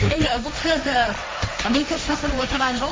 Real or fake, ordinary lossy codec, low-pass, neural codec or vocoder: fake; none; none; codec, 16 kHz, 1.1 kbps, Voila-Tokenizer